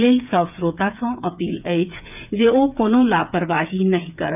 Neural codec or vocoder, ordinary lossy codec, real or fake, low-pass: codec, 16 kHz, 8 kbps, FreqCodec, smaller model; none; fake; 3.6 kHz